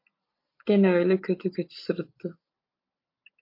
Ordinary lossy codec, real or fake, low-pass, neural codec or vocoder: MP3, 32 kbps; real; 5.4 kHz; none